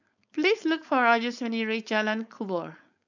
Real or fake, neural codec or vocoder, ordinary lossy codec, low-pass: fake; codec, 16 kHz, 4.8 kbps, FACodec; none; 7.2 kHz